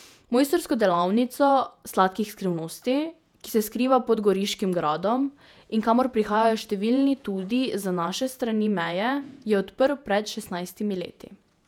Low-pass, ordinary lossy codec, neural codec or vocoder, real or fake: 19.8 kHz; none; vocoder, 48 kHz, 128 mel bands, Vocos; fake